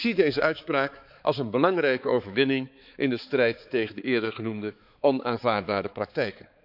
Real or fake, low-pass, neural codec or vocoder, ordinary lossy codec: fake; 5.4 kHz; codec, 16 kHz, 4 kbps, X-Codec, HuBERT features, trained on balanced general audio; none